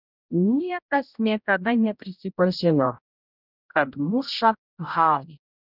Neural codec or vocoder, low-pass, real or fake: codec, 16 kHz, 0.5 kbps, X-Codec, HuBERT features, trained on general audio; 5.4 kHz; fake